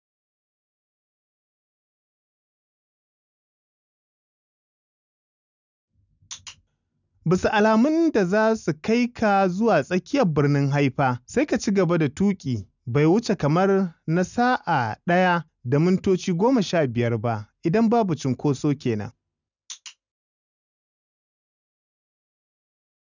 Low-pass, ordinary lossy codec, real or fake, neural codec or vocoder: 7.2 kHz; none; real; none